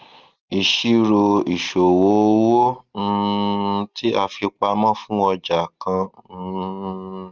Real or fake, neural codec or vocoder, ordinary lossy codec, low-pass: real; none; Opus, 32 kbps; 7.2 kHz